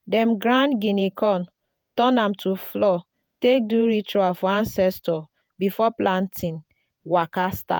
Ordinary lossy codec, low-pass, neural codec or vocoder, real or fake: none; none; vocoder, 48 kHz, 128 mel bands, Vocos; fake